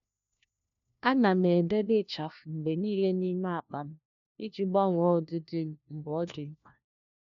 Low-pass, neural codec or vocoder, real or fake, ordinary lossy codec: 7.2 kHz; codec, 16 kHz, 1 kbps, FunCodec, trained on LibriTTS, 50 frames a second; fake; none